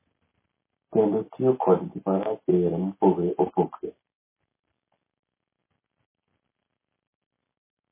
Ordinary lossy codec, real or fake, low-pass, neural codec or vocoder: MP3, 16 kbps; real; 3.6 kHz; none